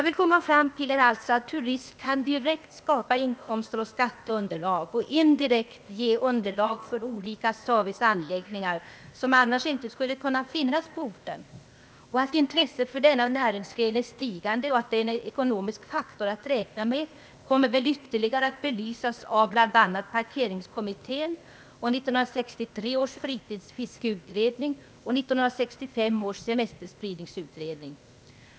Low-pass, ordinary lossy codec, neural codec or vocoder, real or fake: none; none; codec, 16 kHz, 0.8 kbps, ZipCodec; fake